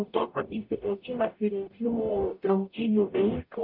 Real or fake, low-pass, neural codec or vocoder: fake; 5.4 kHz; codec, 44.1 kHz, 0.9 kbps, DAC